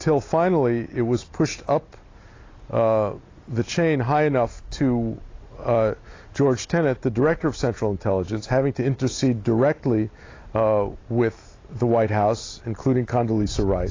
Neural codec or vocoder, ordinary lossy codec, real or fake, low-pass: none; AAC, 32 kbps; real; 7.2 kHz